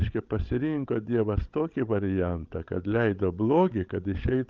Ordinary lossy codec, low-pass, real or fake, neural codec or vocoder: Opus, 24 kbps; 7.2 kHz; fake; codec, 16 kHz, 16 kbps, FreqCodec, larger model